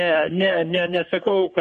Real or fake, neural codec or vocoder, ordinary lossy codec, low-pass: fake; codec, 44.1 kHz, 3.4 kbps, Pupu-Codec; MP3, 48 kbps; 14.4 kHz